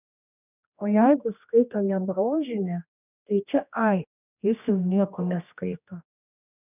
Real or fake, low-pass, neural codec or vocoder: fake; 3.6 kHz; codec, 16 kHz, 1 kbps, X-Codec, HuBERT features, trained on general audio